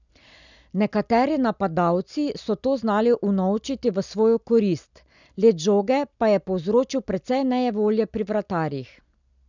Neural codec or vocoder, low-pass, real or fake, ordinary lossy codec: none; 7.2 kHz; real; none